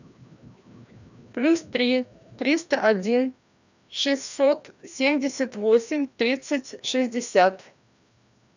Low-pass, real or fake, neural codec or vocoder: 7.2 kHz; fake; codec, 16 kHz, 1 kbps, FreqCodec, larger model